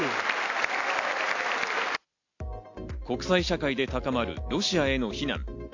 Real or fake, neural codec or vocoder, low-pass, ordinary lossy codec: real; none; 7.2 kHz; AAC, 48 kbps